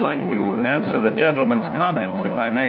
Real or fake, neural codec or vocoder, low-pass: fake; codec, 16 kHz, 1 kbps, FunCodec, trained on LibriTTS, 50 frames a second; 5.4 kHz